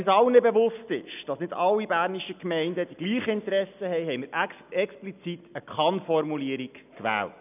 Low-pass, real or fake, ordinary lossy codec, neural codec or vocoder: 3.6 kHz; real; none; none